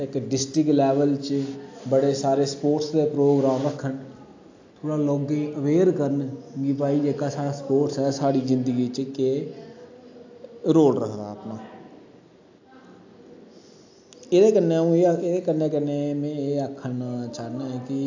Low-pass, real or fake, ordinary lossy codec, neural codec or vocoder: 7.2 kHz; real; AAC, 48 kbps; none